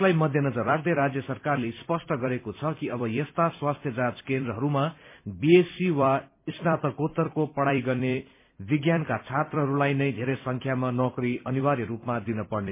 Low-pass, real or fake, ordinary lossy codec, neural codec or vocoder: 3.6 kHz; fake; MP3, 24 kbps; vocoder, 44.1 kHz, 128 mel bands every 256 samples, BigVGAN v2